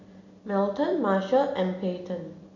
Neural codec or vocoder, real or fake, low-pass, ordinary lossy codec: none; real; 7.2 kHz; none